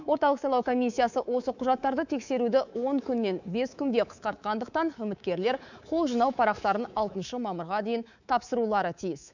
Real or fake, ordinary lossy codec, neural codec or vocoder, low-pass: fake; none; codec, 24 kHz, 3.1 kbps, DualCodec; 7.2 kHz